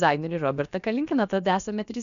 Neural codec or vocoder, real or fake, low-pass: codec, 16 kHz, about 1 kbps, DyCAST, with the encoder's durations; fake; 7.2 kHz